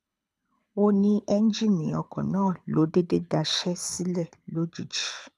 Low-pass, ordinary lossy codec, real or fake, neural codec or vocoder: none; none; fake; codec, 24 kHz, 6 kbps, HILCodec